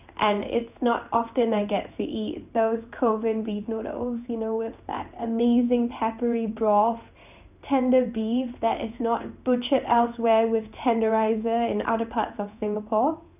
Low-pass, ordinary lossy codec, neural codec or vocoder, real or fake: 3.6 kHz; none; codec, 16 kHz in and 24 kHz out, 1 kbps, XY-Tokenizer; fake